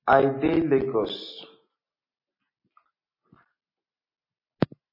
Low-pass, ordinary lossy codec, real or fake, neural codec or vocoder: 5.4 kHz; MP3, 24 kbps; real; none